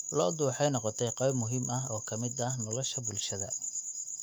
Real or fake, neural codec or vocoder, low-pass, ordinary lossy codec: fake; autoencoder, 48 kHz, 128 numbers a frame, DAC-VAE, trained on Japanese speech; 19.8 kHz; none